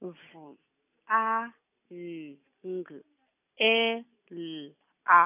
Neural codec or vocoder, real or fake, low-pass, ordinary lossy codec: none; real; 3.6 kHz; none